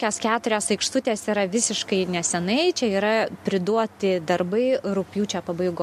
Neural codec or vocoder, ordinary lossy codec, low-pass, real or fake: none; MP3, 64 kbps; 14.4 kHz; real